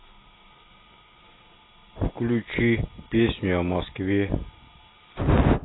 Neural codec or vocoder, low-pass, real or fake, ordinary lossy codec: none; 7.2 kHz; real; AAC, 16 kbps